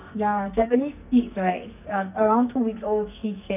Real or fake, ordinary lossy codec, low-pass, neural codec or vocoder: fake; none; 3.6 kHz; codec, 44.1 kHz, 2.6 kbps, SNAC